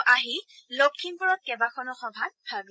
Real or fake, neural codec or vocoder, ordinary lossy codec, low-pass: fake; codec, 16 kHz, 16 kbps, FreqCodec, larger model; none; none